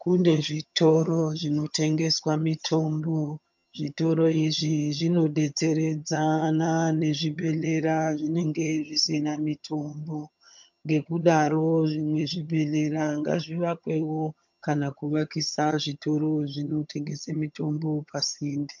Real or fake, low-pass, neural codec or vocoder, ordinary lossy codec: fake; 7.2 kHz; vocoder, 22.05 kHz, 80 mel bands, HiFi-GAN; MP3, 64 kbps